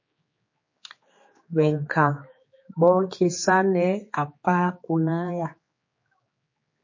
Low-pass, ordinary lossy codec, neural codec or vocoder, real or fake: 7.2 kHz; MP3, 32 kbps; codec, 16 kHz, 4 kbps, X-Codec, HuBERT features, trained on general audio; fake